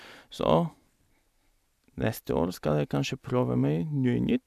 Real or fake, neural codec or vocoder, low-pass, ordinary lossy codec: real; none; 14.4 kHz; none